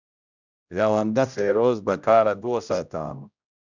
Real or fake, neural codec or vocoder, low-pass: fake; codec, 16 kHz, 0.5 kbps, X-Codec, HuBERT features, trained on general audio; 7.2 kHz